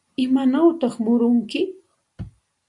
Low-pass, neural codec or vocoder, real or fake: 10.8 kHz; none; real